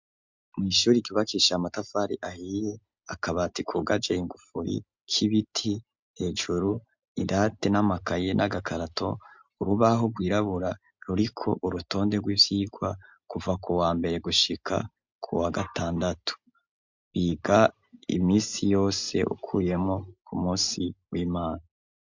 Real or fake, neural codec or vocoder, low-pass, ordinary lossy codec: real; none; 7.2 kHz; MP3, 64 kbps